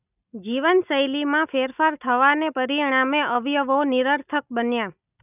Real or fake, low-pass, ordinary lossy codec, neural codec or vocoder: real; 3.6 kHz; none; none